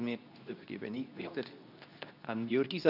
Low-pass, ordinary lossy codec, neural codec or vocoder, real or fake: 5.4 kHz; none; codec, 24 kHz, 0.9 kbps, WavTokenizer, medium speech release version 2; fake